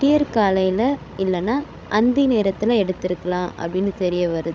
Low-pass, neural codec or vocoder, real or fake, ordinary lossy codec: none; codec, 16 kHz, 16 kbps, FreqCodec, larger model; fake; none